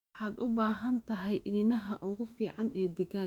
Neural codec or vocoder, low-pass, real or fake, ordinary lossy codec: autoencoder, 48 kHz, 32 numbers a frame, DAC-VAE, trained on Japanese speech; 19.8 kHz; fake; Opus, 64 kbps